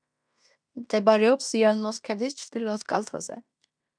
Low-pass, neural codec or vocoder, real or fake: 9.9 kHz; codec, 16 kHz in and 24 kHz out, 0.9 kbps, LongCat-Audio-Codec, fine tuned four codebook decoder; fake